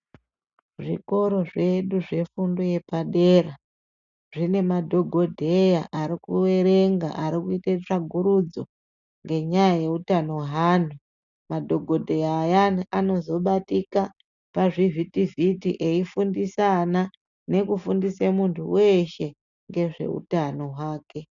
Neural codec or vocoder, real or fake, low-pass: none; real; 7.2 kHz